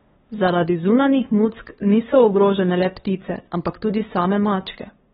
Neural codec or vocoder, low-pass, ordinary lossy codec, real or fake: codec, 16 kHz, 2 kbps, FunCodec, trained on LibriTTS, 25 frames a second; 7.2 kHz; AAC, 16 kbps; fake